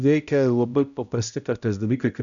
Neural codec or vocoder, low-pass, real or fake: codec, 16 kHz, 0.5 kbps, X-Codec, HuBERT features, trained on balanced general audio; 7.2 kHz; fake